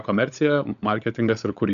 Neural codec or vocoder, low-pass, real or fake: codec, 16 kHz, 16 kbps, FunCodec, trained on LibriTTS, 50 frames a second; 7.2 kHz; fake